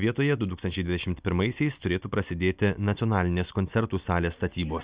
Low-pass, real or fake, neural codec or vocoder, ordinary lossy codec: 3.6 kHz; real; none; Opus, 64 kbps